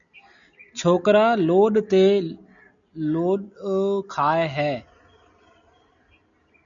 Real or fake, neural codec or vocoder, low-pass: real; none; 7.2 kHz